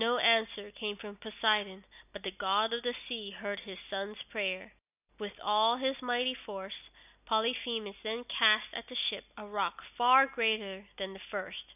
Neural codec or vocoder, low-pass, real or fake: none; 3.6 kHz; real